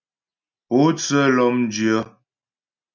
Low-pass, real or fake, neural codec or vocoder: 7.2 kHz; real; none